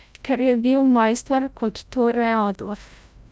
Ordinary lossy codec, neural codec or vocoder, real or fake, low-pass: none; codec, 16 kHz, 0.5 kbps, FreqCodec, larger model; fake; none